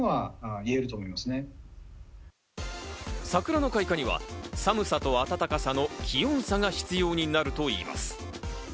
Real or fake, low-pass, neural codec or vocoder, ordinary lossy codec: real; none; none; none